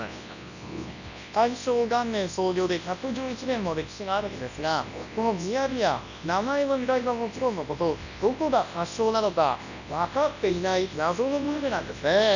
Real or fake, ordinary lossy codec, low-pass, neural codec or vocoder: fake; none; 7.2 kHz; codec, 24 kHz, 0.9 kbps, WavTokenizer, large speech release